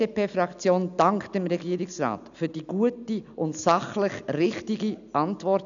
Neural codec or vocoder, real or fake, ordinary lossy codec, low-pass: none; real; none; 7.2 kHz